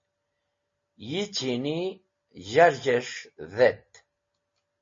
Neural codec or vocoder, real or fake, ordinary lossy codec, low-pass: none; real; MP3, 32 kbps; 7.2 kHz